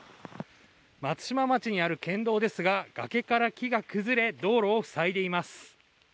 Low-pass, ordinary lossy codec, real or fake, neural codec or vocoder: none; none; real; none